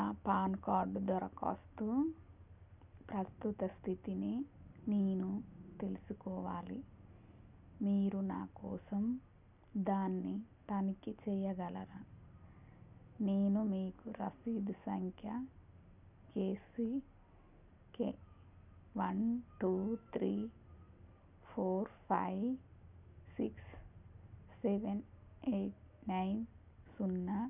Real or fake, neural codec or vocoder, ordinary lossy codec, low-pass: real; none; none; 3.6 kHz